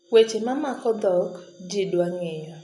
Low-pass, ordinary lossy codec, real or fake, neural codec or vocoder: 10.8 kHz; none; real; none